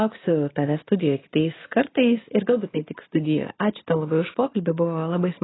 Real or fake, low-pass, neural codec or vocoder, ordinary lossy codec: real; 7.2 kHz; none; AAC, 16 kbps